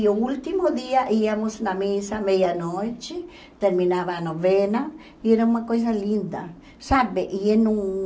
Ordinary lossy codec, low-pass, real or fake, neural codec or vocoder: none; none; real; none